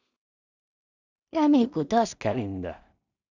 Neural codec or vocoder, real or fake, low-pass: codec, 16 kHz in and 24 kHz out, 0.4 kbps, LongCat-Audio-Codec, two codebook decoder; fake; 7.2 kHz